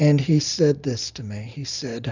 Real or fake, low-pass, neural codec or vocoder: real; 7.2 kHz; none